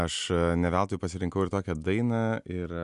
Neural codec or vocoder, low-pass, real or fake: none; 10.8 kHz; real